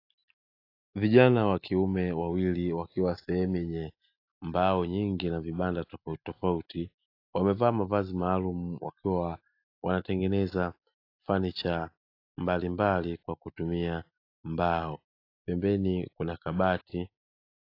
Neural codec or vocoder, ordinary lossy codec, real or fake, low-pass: none; AAC, 32 kbps; real; 5.4 kHz